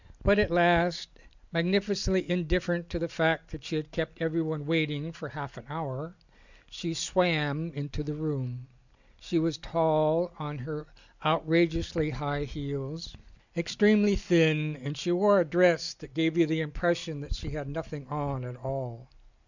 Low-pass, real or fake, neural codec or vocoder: 7.2 kHz; real; none